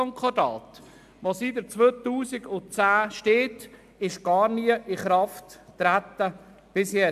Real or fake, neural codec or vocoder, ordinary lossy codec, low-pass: real; none; AAC, 96 kbps; 14.4 kHz